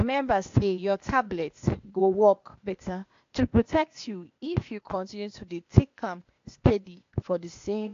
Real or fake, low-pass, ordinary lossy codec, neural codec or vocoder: fake; 7.2 kHz; AAC, 48 kbps; codec, 16 kHz, 0.8 kbps, ZipCodec